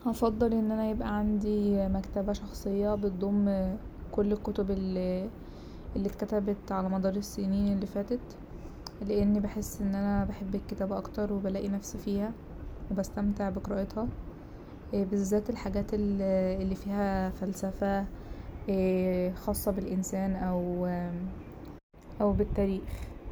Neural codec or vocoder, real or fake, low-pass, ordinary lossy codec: none; real; none; none